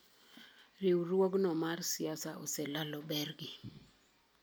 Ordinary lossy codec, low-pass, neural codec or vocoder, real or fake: none; none; none; real